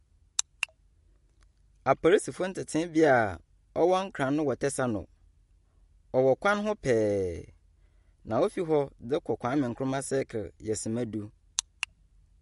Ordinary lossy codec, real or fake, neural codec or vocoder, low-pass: MP3, 48 kbps; real; none; 14.4 kHz